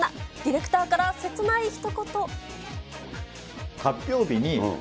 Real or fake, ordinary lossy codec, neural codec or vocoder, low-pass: real; none; none; none